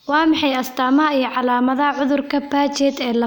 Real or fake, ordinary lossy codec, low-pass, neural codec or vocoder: real; none; none; none